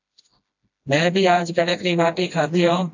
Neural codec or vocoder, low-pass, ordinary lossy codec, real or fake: codec, 16 kHz, 1 kbps, FreqCodec, smaller model; 7.2 kHz; AAC, 48 kbps; fake